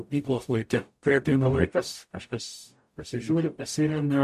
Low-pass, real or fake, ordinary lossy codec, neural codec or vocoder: 14.4 kHz; fake; MP3, 64 kbps; codec, 44.1 kHz, 0.9 kbps, DAC